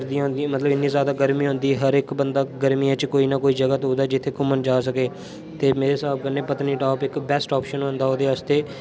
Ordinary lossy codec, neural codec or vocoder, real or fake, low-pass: none; none; real; none